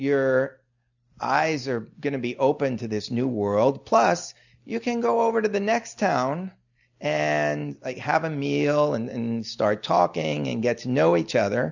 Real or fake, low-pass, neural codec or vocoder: real; 7.2 kHz; none